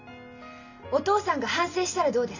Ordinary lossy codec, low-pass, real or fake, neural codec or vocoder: none; 7.2 kHz; real; none